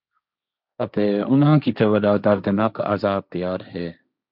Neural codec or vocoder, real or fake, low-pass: codec, 16 kHz, 1.1 kbps, Voila-Tokenizer; fake; 5.4 kHz